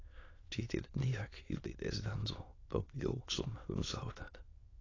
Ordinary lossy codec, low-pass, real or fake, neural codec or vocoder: AAC, 32 kbps; 7.2 kHz; fake; autoencoder, 22.05 kHz, a latent of 192 numbers a frame, VITS, trained on many speakers